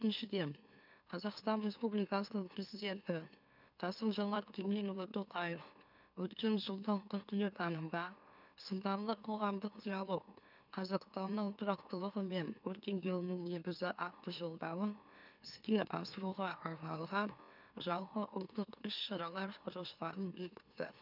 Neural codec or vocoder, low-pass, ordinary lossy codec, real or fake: autoencoder, 44.1 kHz, a latent of 192 numbers a frame, MeloTTS; 5.4 kHz; none; fake